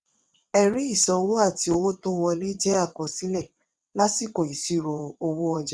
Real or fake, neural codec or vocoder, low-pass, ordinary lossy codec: fake; vocoder, 22.05 kHz, 80 mel bands, WaveNeXt; none; none